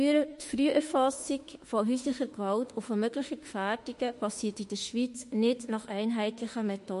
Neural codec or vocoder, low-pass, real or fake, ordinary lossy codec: autoencoder, 48 kHz, 32 numbers a frame, DAC-VAE, trained on Japanese speech; 14.4 kHz; fake; MP3, 48 kbps